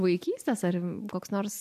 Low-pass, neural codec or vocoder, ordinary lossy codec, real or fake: 14.4 kHz; none; MP3, 96 kbps; real